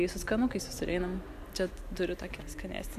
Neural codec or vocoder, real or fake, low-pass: none; real; 14.4 kHz